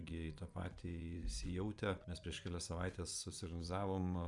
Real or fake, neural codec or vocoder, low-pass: fake; vocoder, 24 kHz, 100 mel bands, Vocos; 10.8 kHz